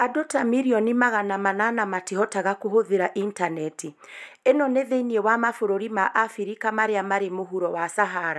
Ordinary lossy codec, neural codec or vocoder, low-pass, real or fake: none; none; none; real